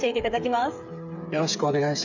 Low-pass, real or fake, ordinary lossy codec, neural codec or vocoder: 7.2 kHz; fake; none; codec, 16 kHz, 8 kbps, FreqCodec, smaller model